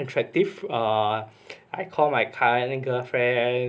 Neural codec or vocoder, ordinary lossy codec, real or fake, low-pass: none; none; real; none